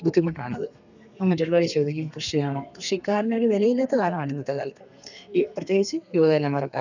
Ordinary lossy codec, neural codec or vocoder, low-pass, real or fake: none; codec, 32 kHz, 1.9 kbps, SNAC; 7.2 kHz; fake